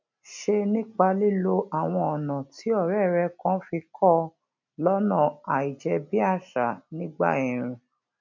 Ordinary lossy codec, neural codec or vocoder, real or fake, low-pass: MP3, 64 kbps; none; real; 7.2 kHz